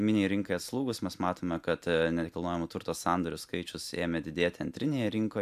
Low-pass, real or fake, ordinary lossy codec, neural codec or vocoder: 14.4 kHz; real; MP3, 96 kbps; none